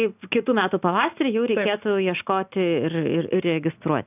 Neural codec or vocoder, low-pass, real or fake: none; 3.6 kHz; real